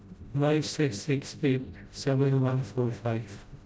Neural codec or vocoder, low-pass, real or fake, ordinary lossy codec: codec, 16 kHz, 0.5 kbps, FreqCodec, smaller model; none; fake; none